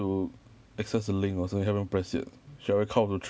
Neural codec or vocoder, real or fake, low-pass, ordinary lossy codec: none; real; none; none